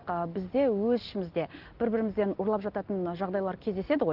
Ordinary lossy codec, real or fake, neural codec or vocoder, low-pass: Opus, 16 kbps; real; none; 5.4 kHz